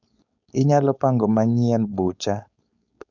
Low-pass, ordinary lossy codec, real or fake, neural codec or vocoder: 7.2 kHz; none; fake; codec, 16 kHz, 4.8 kbps, FACodec